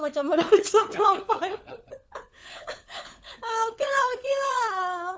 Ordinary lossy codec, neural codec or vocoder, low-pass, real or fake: none; codec, 16 kHz, 4 kbps, FunCodec, trained on LibriTTS, 50 frames a second; none; fake